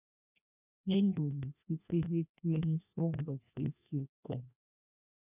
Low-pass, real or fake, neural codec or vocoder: 3.6 kHz; fake; codec, 16 kHz, 1 kbps, FreqCodec, larger model